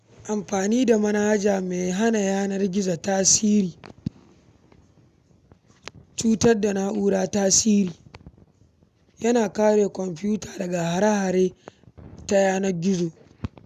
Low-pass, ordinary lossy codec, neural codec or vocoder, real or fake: 14.4 kHz; none; none; real